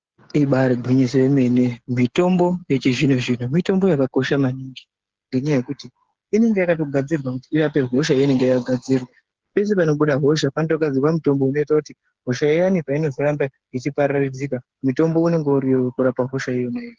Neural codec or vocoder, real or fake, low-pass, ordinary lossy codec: codec, 16 kHz, 8 kbps, FreqCodec, smaller model; fake; 7.2 kHz; Opus, 16 kbps